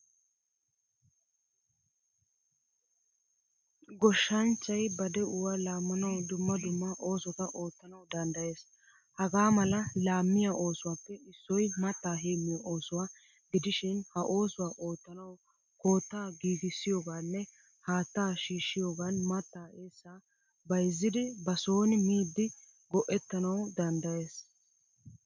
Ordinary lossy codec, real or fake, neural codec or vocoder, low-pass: MP3, 64 kbps; real; none; 7.2 kHz